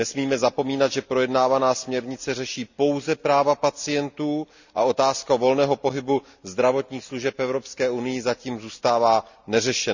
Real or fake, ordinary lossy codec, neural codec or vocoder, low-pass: real; none; none; 7.2 kHz